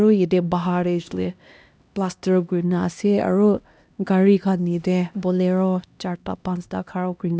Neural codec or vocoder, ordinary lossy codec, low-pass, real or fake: codec, 16 kHz, 1 kbps, X-Codec, HuBERT features, trained on LibriSpeech; none; none; fake